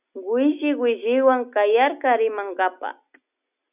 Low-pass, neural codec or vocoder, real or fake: 3.6 kHz; none; real